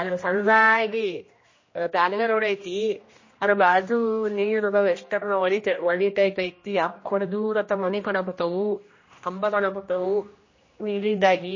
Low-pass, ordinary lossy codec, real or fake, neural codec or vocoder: 7.2 kHz; MP3, 32 kbps; fake; codec, 16 kHz, 1 kbps, X-Codec, HuBERT features, trained on general audio